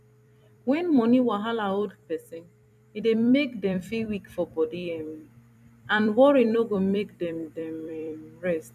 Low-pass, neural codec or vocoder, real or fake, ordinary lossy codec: 14.4 kHz; vocoder, 44.1 kHz, 128 mel bands every 256 samples, BigVGAN v2; fake; none